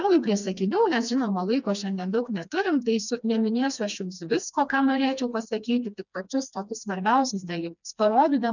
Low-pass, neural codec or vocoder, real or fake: 7.2 kHz; codec, 16 kHz, 2 kbps, FreqCodec, smaller model; fake